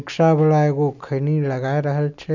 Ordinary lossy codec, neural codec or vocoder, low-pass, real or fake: none; none; 7.2 kHz; real